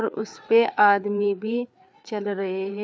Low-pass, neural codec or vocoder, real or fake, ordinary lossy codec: none; codec, 16 kHz, 4 kbps, FreqCodec, larger model; fake; none